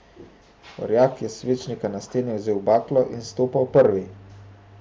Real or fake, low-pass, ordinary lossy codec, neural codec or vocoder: real; none; none; none